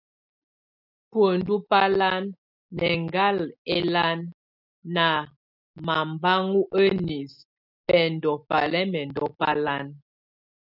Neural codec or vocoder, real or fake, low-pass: none; real; 5.4 kHz